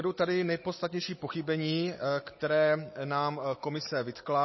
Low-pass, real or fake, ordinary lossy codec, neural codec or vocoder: 7.2 kHz; real; MP3, 24 kbps; none